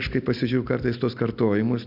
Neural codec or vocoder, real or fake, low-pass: none; real; 5.4 kHz